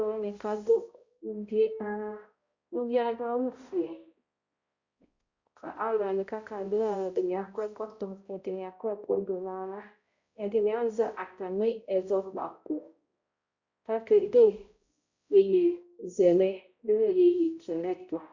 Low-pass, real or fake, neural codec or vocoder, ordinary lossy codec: 7.2 kHz; fake; codec, 16 kHz, 0.5 kbps, X-Codec, HuBERT features, trained on balanced general audio; Opus, 64 kbps